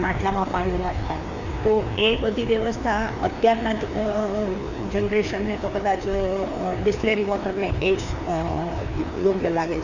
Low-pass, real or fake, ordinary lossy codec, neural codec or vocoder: 7.2 kHz; fake; none; codec, 16 kHz, 2 kbps, FreqCodec, larger model